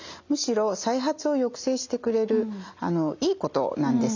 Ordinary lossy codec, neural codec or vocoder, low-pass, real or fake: none; none; 7.2 kHz; real